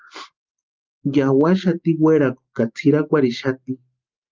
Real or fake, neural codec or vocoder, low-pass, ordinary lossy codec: real; none; 7.2 kHz; Opus, 24 kbps